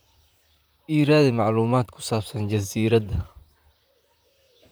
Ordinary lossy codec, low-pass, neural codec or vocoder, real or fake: none; none; vocoder, 44.1 kHz, 128 mel bands, Pupu-Vocoder; fake